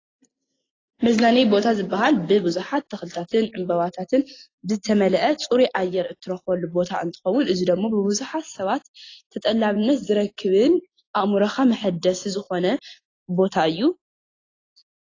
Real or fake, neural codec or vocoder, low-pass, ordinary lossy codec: real; none; 7.2 kHz; AAC, 32 kbps